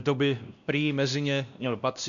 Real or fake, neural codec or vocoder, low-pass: fake; codec, 16 kHz, 1 kbps, X-Codec, WavLM features, trained on Multilingual LibriSpeech; 7.2 kHz